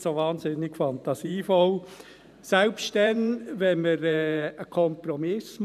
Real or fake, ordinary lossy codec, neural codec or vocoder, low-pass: fake; none; vocoder, 44.1 kHz, 128 mel bands every 512 samples, BigVGAN v2; 14.4 kHz